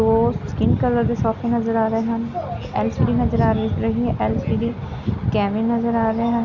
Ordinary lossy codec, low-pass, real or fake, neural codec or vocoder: none; 7.2 kHz; real; none